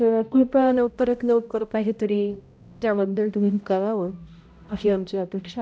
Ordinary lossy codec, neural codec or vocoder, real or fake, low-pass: none; codec, 16 kHz, 0.5 kbps, X-Codec, HuBERT features, trained on balanced general audio; fake; none